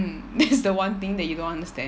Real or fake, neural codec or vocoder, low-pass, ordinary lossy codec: real; none; none; none